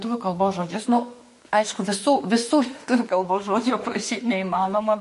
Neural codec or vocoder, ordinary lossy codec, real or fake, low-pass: autoencoder, 48 kHz, 32 numbers a frame, DAC-VAE, trained on Japanese speech; MP3, 48 kbps; fake; 14.4 kHz